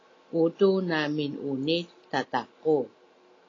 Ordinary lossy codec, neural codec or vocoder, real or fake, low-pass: AAC, 32 kbps; none; real; 7.2 kHz